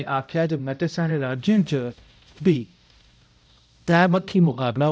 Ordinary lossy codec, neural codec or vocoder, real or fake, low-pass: none; codec, 16 kHz, 0.5 kbps, X-Codec, HuBERT features, trained on balanced general audio; fake; none